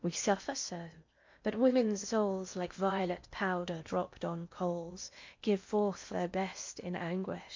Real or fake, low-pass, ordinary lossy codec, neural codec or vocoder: fake; 7.2 kHz; MP3, 48 kbps; codec, 16 kHz in and 24 kHz out, 0.8 kbps, FocalCodec, streaming, 65536 codes